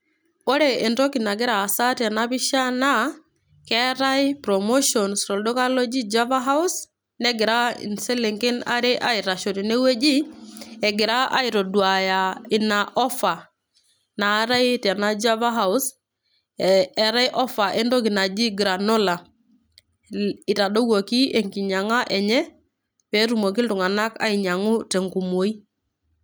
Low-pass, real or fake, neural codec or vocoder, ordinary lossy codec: none; real; none; none